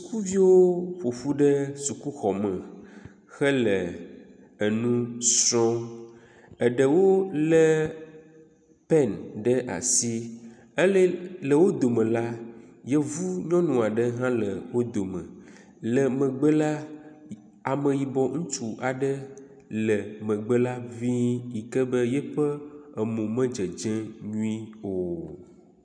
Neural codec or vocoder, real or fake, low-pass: none; real; 9.9 kHz